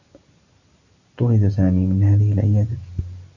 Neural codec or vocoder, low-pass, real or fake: none; 7.2 kHz; real